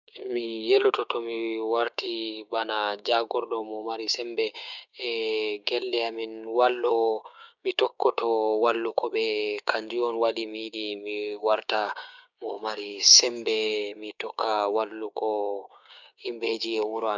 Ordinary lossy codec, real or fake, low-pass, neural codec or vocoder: none; fake; 7.2 kHz; codec, 16 kHz, 6 kbps, DAC